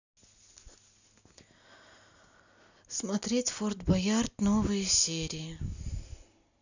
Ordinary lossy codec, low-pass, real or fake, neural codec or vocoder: none; 7.2 kHz; real; none